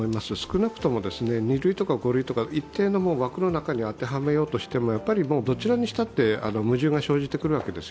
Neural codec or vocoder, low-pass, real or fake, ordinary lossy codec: none; none; real; none